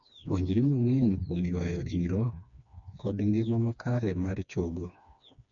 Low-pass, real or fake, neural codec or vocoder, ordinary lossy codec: 7.2 kHz; fake; codec, 16 kHz, 2 kbps, FreqCodec, smaller model; none